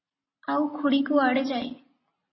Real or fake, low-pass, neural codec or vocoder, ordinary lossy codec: real; 7.2 kHz; none; MP3, 24 kbps